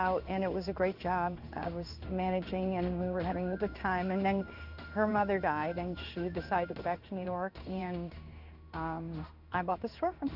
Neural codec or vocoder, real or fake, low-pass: codec, 16 kHz in and 24 kHz out, 1 kbps, XY-Tokenizer; fake; 5.4 kHz